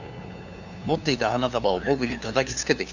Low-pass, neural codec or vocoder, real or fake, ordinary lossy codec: 7.2 kHz; codec, 16 kHz, 2 kbps, FunCodec, trained on LibriTTS, 25 frames a second; fake; none